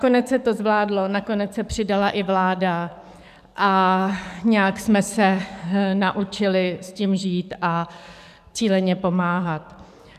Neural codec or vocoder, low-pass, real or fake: codec, 44.1 kHz, 7.8 kbps, DAC; 14.4 kHz; fake